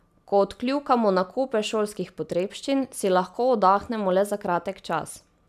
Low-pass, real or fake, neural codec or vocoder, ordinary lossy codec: 14.4 kHz; real; none; none